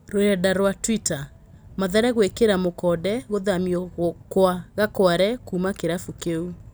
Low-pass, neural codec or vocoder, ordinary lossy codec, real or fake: none; none; none; real